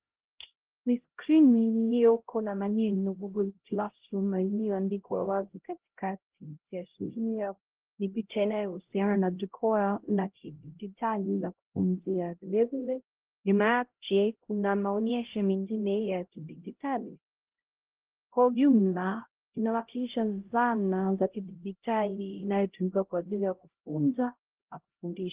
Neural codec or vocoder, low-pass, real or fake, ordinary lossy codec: codec, 16 kHz, 0.5 kbps, X-Codec, HuBERT features, trained on LibriSpeech; 3.6 kHz; fake; Opus, 16 kbps